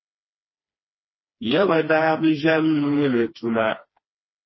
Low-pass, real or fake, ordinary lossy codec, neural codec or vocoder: 7.2 kHz; fake; MP3, 24 kbps; codec, 16 kHz, 2 kbps, FreqCodec, smaller model